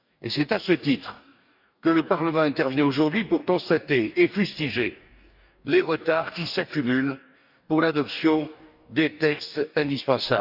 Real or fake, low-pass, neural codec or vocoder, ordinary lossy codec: fake; 5.4 kHz; codec, 44.1 kHz, 2.6 kbps, DAC; none